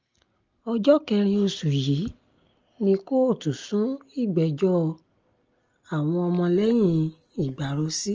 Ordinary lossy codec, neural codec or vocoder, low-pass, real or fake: Opus, 24 kbps; none; 7.2 kHz; real